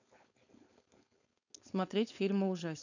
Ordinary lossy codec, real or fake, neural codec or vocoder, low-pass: none; fake; codec, 16 kHz, 4.8 kbps, FACodec; 7.2 kHz